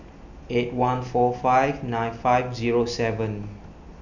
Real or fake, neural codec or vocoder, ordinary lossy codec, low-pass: real; none; none; 7.2 kHz